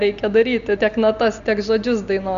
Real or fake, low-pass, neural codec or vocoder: real; 7.2 kHz; none